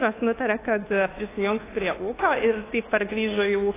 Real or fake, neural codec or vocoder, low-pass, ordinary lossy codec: fake; codec, 24 kHz, 1.2 kbps, DualCodec; 3.6 kHz; AAC, 16 kbps